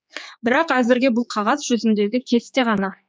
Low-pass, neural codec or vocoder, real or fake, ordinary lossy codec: none; codec, 16 kHz, 4 kbps, X-Codec, HuBERT features, trained on general audio; fake; none